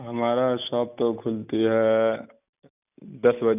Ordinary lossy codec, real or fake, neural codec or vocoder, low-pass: none; real; none; 3.6 kHz